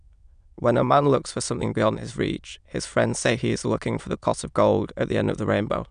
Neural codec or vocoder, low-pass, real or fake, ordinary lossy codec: autoencoder, 22.05 kHz, a latent of 192 numbers a frame, VITS, trained on many speakers; 9.9 kHz; fake; none